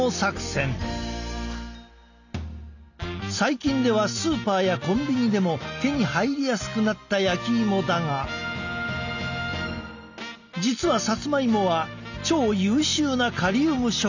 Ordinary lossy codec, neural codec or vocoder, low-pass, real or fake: none; none; 7.2 kHz; real